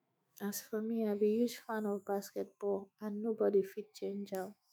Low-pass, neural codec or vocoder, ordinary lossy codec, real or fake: none; autoencoder, 48 kHz, 128 numbers a frame, DAC-VAE, trained on Japanese speech; none; fake